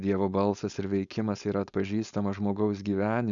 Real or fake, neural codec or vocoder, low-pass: fake; codec, 16 kHz, 4.8 kbps, FACodec; 7.2 kHz